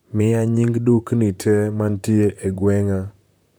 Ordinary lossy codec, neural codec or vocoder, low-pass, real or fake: none; vocoder, 44.1 kHz, 128 mel bands, Pupu-Vocoder; none; fake